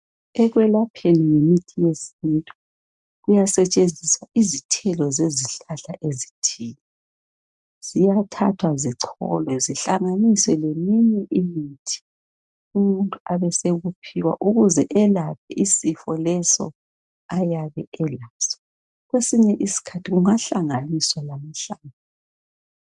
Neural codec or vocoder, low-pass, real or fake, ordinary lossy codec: none; 10.8 kHz; real; MP3, 96 kbps